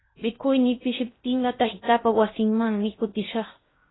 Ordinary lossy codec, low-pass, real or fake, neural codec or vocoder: AAC, 16 kbps; 7.2 kHz; fake; codec, 16 kHz in and 24 kHz out, 0.6 kbps, FocalCodec, streaming, 2048 codes